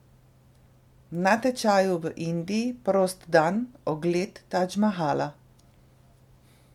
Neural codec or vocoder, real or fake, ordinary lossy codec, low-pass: none; real; MP3, 96 kbps; 19.8 kHz